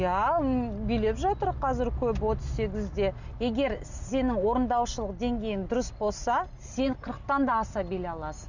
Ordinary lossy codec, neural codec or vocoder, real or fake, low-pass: none; none; real; 7.2 kHz